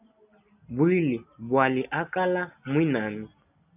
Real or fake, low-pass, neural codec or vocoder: real; 3.6 kHz; none